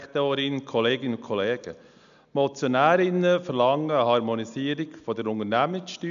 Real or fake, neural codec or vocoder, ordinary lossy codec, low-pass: real; none; none; 7.2 kHz